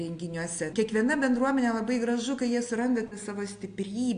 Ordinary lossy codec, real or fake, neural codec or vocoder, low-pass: AAC, 64 kbps; real; none; 9.9 kHz